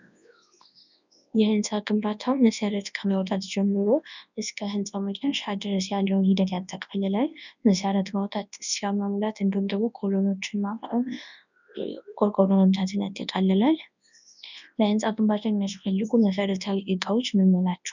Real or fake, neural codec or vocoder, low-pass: fake; codec, 24 kHz, 0.9 kbps, WavTokenizer, large speech release; 7.2 kHz